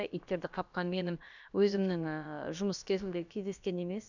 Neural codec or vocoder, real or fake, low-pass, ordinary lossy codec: codec, 16 kHz, about 1 kbps, DyCAST, with the encoder's durations; fake; 7.2 kHz; none